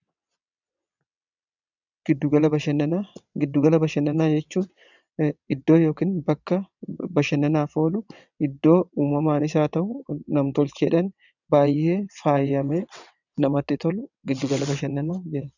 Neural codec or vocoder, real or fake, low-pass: vocoder, 22.05 kHz, 80 mel bands, WaveNeXt; fake; 7.2 kHz